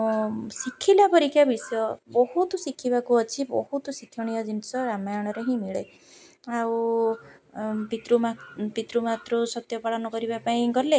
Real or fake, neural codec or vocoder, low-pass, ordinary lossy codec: real; none; none; none